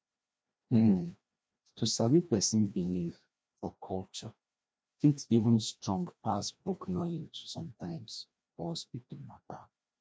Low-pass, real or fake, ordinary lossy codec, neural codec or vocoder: none; fake; none; codec, 16 kHz, 1 kbps, FreqCodec, larger model